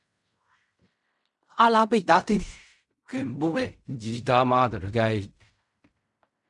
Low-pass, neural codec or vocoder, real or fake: 10.8 kHz; codec, 16 kHz in and 24 kHz out, 0.4 kbps, LongCat-Audio-Codec, fine tuned four codebook decoder; fake